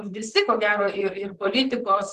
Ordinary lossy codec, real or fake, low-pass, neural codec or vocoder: Opus, 16 kbps; fake; 14.4 kHz; vocoder, 44.1 kHz, 128 mel bands, Pupu-Vocoder